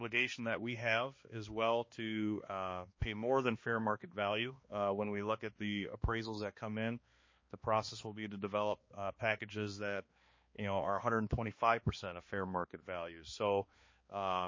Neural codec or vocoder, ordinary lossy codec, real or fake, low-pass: codec, 16 kHz, 2 kbps, X-Codec, HuBERT features, trained on balanced general audio; MP3, 32 kbps; fake; 7.2 kHz